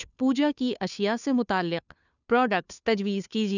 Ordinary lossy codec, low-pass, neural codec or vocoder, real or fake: none; 7.2 kHz; codec, 16 kHz, 2 kbps, FunCodec, trained on Chinese and English, 25 frames a second; fake